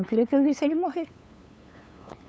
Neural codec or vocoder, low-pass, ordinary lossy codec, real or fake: codec, 16 kHz, 8 kbps, FunCodec, trained on LibriTTS, 25 frames a second; none; none; fake